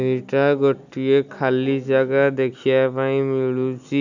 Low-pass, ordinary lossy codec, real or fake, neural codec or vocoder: 7.2 kHz; none; real; none